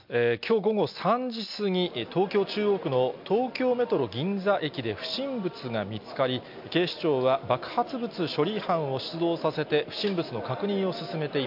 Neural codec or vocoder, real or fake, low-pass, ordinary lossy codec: none; real; 5.4 kHz; MP3, 48 kbps